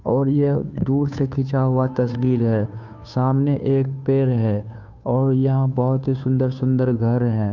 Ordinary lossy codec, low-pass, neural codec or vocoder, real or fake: none; 7.2 kHz; codec, 16 kHz, 2 kbps, FunCodec, trained on Chinese and English, 25 frames a second; fake